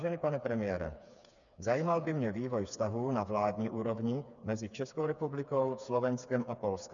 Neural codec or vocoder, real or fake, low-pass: codec, 16 kHz, 4 kbps, FreqCodec, smaller model; fake; 7.2 kHz